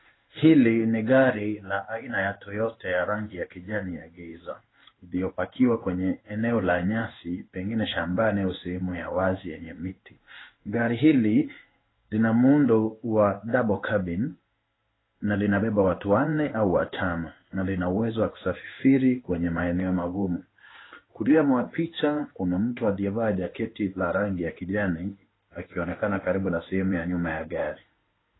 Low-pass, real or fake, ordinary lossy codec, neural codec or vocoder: 7.2 kHz; fake; AAC, 16 kbps; codec, 16 kHz in and 24 kHz out, 1 kbps, XY-Tokenizer